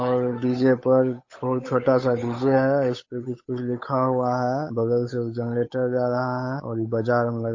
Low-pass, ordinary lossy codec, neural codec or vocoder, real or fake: 7.2 kHz; MP3, 32 kbps; codec, 16 kHz, 8 kbps, FunCodec, trained on Chinese and English, 25 frames a second; fake